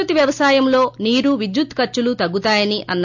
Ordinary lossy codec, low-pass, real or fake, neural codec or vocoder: MP3, 64 kbps; 7.2 kHz; real; none